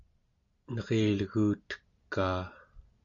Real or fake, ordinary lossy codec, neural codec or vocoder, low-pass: real; AAC, 64 kbps; none; 7.2 kHz